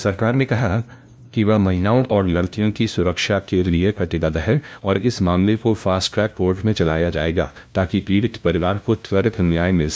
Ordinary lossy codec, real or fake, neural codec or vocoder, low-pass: none; fake; codec, 16 kHz, 0.5 kbps, FunCodec, trained on LibriTTS, 25 frames a second; none